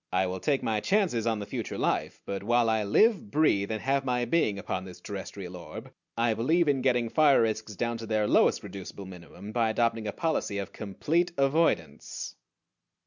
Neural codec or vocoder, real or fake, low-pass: none; real; 7.2 kHz